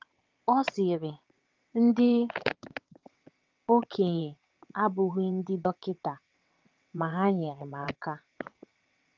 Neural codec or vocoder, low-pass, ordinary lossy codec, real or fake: vocoder, 22.05 kHz, 80 mel bands, WaveNeXt; 7.2 kHz; Opus, 32 kbps; fake